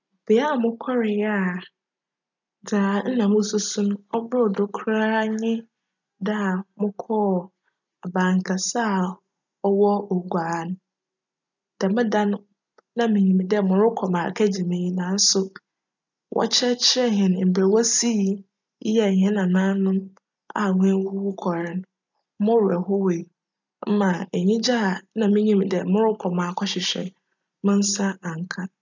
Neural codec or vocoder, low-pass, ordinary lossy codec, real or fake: none; 7.2 kHz; none; real